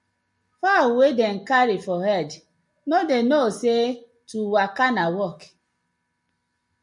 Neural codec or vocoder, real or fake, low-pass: none; real; 10.8 kHz